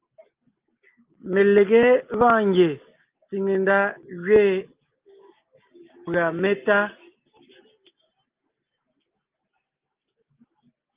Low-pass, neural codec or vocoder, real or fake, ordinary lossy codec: 3.6 kHz; none; real; Opus, 24 kbps